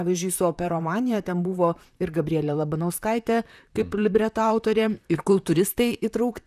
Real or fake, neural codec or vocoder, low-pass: fake; vocoder, 44.1 kHz, 128 mel bands, Pupu-Vocoder; 14.4 kHz